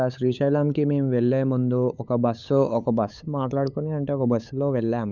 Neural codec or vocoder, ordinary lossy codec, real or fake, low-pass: codec, 16 kHz, 8 kbps, FunCodec, trained on LibriTTS, 25 frames a second; none; fake; 7.2 kHz